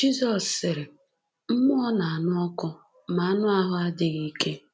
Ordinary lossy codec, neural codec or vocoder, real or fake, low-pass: none; none; real; none